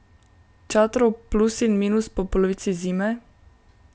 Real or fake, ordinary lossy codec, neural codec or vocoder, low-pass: real; none; none; none